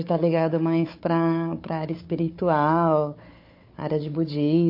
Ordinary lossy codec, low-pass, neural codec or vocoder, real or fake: MP3, 32 kbps; 5.4 kHz; codec, 16 kHz, 4 kbps, FunCodec, trained on Chinese and English, 50 frames a second; fake